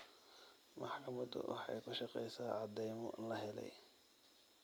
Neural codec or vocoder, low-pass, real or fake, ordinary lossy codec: none; none; real; none